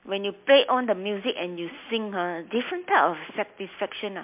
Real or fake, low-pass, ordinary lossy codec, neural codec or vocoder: real; 3.6 kHz; MP3, 32 kbps; none